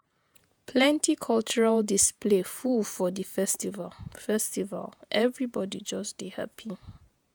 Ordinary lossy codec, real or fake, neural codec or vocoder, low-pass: none; fake; vocoder, 48 kHz, 128 mel bands, Vocos; none